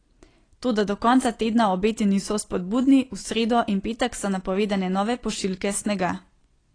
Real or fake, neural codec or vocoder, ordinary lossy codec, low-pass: real; none; AAC, 32 kbps; 9.9 kHz